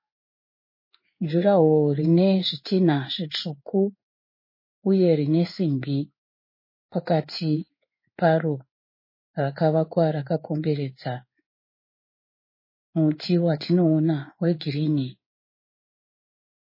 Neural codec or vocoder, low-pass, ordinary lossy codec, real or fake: codec, 16 kHz in and 24 kHz out, 1 kbps, XY-Tokenizer; 5.4 kHz; MP3, 24 kbps; fake